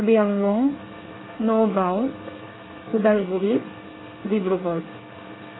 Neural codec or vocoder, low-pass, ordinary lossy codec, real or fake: codec, 24 kHz, 1 kbps, SNAC; 7.2 kHz; AAC, 16 kbps; fake